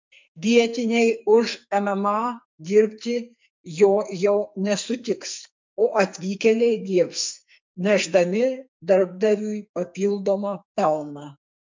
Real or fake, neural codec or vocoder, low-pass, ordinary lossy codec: fake; codec, 44.1 kHz, 2.6 kbps, SNAC; 7.2 kHz; MP3, 64 kbps